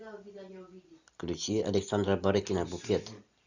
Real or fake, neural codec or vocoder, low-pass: real; none; 7.2 kHz